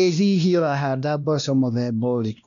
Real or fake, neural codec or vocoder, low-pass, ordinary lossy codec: fake; codec, 16 kHz, 1 kbps, X-Codec, WavLM features, trained on Multilingual LibriSpeech; 7.2 kHz; none